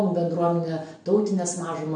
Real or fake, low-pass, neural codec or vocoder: real; 9.9 kHz; none